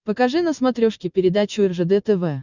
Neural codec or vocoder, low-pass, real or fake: none; 7.2 kHz; real